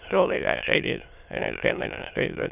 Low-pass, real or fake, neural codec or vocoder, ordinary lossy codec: 3.6 kHz; fake; autoencoder, 22.05 kHz, a latent of 192 numbers a frame, VITS, trained on many speakers; none